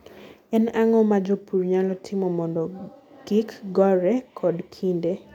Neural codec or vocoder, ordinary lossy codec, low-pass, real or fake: none; none; 19.8 kHz; real